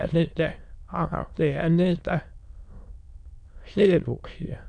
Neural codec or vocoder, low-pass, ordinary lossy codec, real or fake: autoencoder, 22.05 kHz, a latent of 192 numbers a frame, VITS, trained on many speakers; 9.9 kHz; none; fake